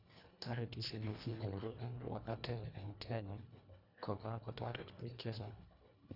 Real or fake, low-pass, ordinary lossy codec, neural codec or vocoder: fake; 5.4 kHz; none; codec, 24 kHz, 1.5 kbps, HILCodec